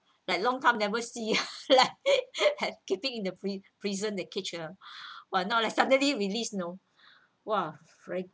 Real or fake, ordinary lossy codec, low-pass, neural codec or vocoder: real; none; none; none